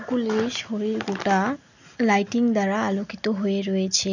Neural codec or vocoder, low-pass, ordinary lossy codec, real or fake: none; 7.2 kHz; none; real